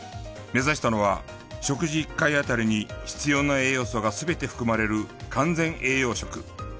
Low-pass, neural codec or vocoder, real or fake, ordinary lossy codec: none; none; real; none